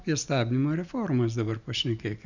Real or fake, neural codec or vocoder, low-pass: real; none; 7.2 kHz